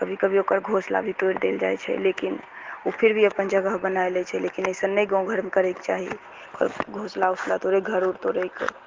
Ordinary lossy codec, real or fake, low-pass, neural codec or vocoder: Opus, 16 kbps; real; 7.2 kHz; none